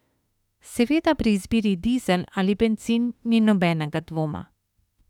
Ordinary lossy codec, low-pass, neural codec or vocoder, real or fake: none; 19.8 kHz; autoencoder, 48 kHz, 32 numbers a frame, DAC-VAE, trained on Japanese speech; fake